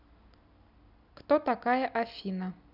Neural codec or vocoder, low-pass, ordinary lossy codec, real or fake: none; 5.4 kHz; none; real